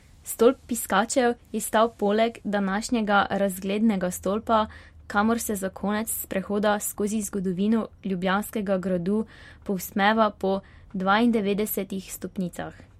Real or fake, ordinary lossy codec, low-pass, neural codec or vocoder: real; MP3, 64 kbps; 19.8 kHz; none